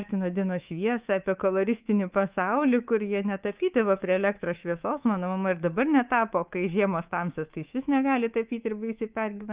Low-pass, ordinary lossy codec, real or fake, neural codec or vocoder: 3.6 kHz; Opus, 32 kbps; fake; codec, 24 kHz, 3.1 kbps, DualCodec